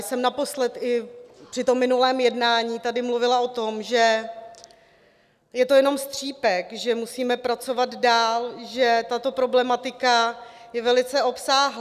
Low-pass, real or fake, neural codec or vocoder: 14.4 kHz; real; none